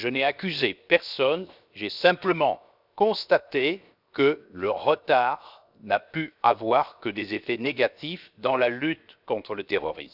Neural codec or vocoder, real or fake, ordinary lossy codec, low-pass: codec, 16 kHz, 0.7 kbps, FocalCodec; fake; none; 5.4 kHz